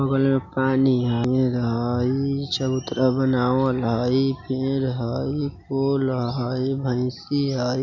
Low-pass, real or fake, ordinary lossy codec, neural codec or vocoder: 7.2 kHz; real; MP3, 64 kbps; none